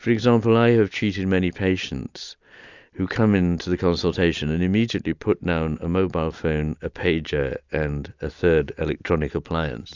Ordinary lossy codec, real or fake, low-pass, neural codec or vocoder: Opus, 64 kbps; real; 7.2 kHz; none